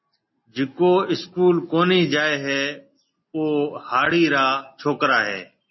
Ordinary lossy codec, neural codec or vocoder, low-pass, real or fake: MP3, 24 kbps; none; 7.2 kHz; real